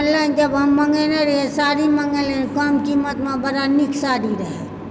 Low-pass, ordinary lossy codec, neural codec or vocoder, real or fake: none; none; none; real